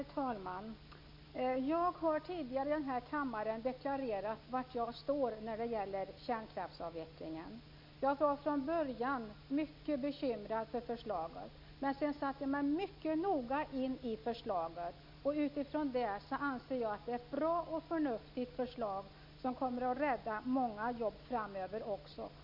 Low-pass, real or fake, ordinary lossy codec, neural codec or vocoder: 5.4 kHz; real; MP3, 48 kbps; none